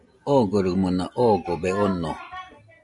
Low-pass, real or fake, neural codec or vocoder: 10.8 kHz; real; none